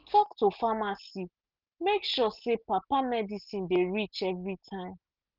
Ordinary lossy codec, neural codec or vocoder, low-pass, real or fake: Opus, 24 kbps; none; 5.4 kHz; real